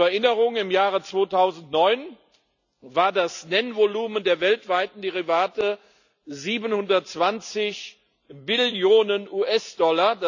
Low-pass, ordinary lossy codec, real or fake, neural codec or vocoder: 7.2 kHz; none; real; none